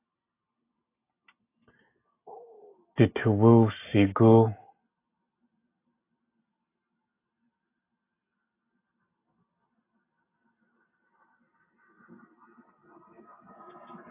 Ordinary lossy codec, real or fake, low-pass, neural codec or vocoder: AAC, 24 kbps; real; 3.6 kHz; none